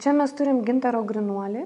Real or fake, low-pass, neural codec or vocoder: real; 10.8 kHz; none